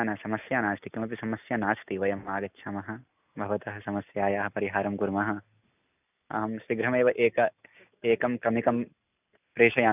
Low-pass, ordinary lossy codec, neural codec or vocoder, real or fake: 3.6 kHz; none; none; real